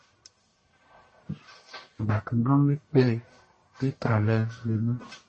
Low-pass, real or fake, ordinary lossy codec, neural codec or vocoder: 10.8 kHz; fake; MP3, 32 kbps; codec, 44.1 kHz, 1.7 kbps, Pupu-Codec